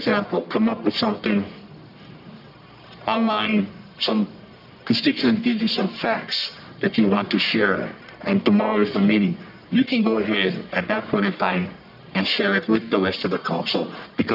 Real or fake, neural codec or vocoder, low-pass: fake; codec, 44.1 kHz, 1.7 kbps, Pupu-Codec; 5.4 kHz